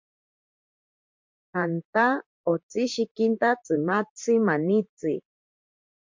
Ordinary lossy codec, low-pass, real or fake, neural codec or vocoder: MP3, 48 kbps; 7.2 kHz; fake; vocoder, 44.1 kHz, 128 mel bands, Pupu-Vocoder